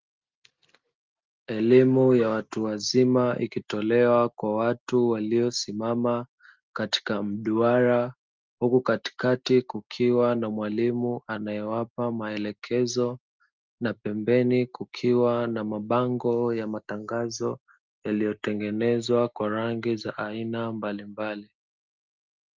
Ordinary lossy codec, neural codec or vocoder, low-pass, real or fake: Opus, 32 kbps; none; 7.2 kHz; real